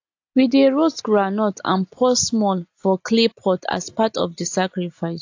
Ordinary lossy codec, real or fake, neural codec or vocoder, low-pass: AAC, 48 kbps; real; none; 7.2 kHz